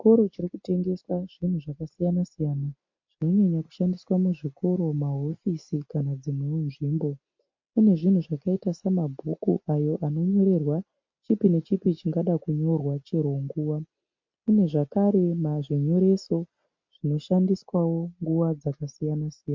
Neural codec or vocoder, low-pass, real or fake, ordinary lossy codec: none; 7.2 kHz; real; MP3, 48 kbps